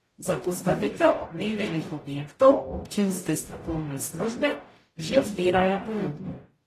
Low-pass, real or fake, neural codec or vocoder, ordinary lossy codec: 14.4 kHz; fake; codec, 44.1 kHz, 0.9 kbps, DAC; AAC, 48 kbps